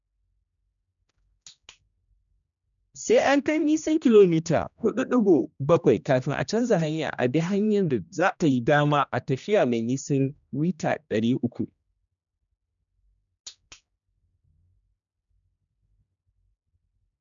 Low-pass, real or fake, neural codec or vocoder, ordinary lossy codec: 7.2 kHz; fake; codec, 16 kHz, 1 kbps, X-Codec, HuBERT features, trained on general audio; none